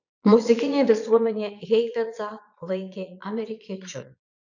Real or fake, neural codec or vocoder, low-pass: fake; vocoder, 22.05 kHz, 80 mel bands, Vocos; 7.2 kHz